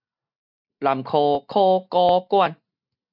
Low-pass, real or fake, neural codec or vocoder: 5.4 kHz; real; none